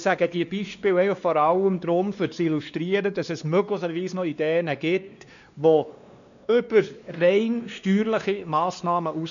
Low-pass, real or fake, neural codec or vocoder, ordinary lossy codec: 7.2 kHz; fake; codec, 16 kHz, 2 kbps, X-Codec, WavLM features, trained on Multilingual LibriSpeech; none